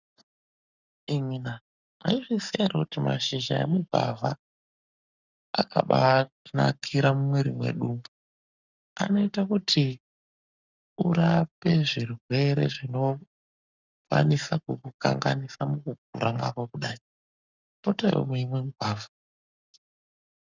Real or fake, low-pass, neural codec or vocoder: fake; 7.2 kHz; codec, 44.1 kHz, 7.8 kbps, Pupu-Codec